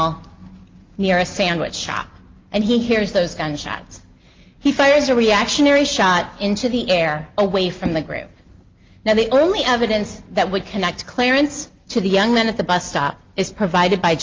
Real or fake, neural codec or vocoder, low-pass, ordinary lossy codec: real; none; 7.2 kHz; Opus, 32 kbps